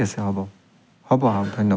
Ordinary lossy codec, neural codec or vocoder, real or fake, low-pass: none; none; real; none